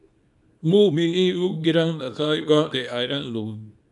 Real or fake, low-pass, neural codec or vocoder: fake; 10.8 kHz; codec, 24 kHz, 0.9 kbps, WavTokenizer, small release